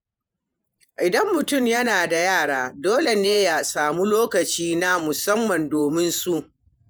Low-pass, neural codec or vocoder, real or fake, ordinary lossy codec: none; vocoder, 48 kHz, 128 mel bands, Vocos; fake; none